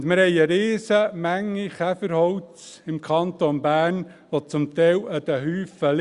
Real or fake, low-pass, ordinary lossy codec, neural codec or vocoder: real; 10.8 kHz; Opus, 64 kbps; none